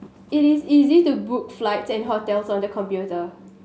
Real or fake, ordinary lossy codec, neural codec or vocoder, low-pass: real; none; none; none